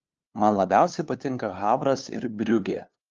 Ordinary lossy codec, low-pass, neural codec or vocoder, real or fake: Opus, 24 kbps; 7.2 kHz; codec, 16 kHz, 2 kbps, FunCodec, trained on LibriTTS, 25 frames a second; fake